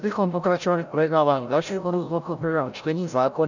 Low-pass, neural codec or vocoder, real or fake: 7.2 kHz; codec, 16 kHz, 0.5 kbps, FreqCodec, larger model; fake